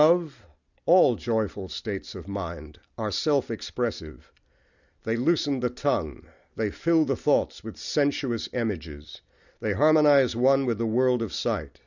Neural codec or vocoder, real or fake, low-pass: none; real; 7.2 kHz